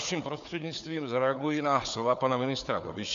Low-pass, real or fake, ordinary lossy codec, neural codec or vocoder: 7.2 kHz; fake; MP3, 64 kbps; codec, 16 kHz, 4 kbps, FunCodec, trained on Chinese and English, 50 frames a second